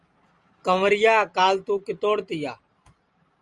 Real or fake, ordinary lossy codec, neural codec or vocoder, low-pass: real; Opus, 32 kbps; none; 10.8 kHz